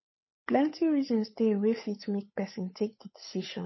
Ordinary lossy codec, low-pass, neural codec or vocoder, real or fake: MP3, 24 kbps; 7.2 kHz; codec, 16 kHz, 8 kbps, FunCodec, trained on Chinese and English, 25 frames a second; fake